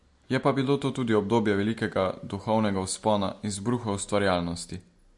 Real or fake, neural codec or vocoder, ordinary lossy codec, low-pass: real; none; MP3, 48 kbps; 10.8 kHz